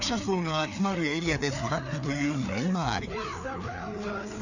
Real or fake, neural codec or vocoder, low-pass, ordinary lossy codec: fake; codec, 16 kHz, 4 kbps, FreqCodec, larger model; 7.2 kHz; none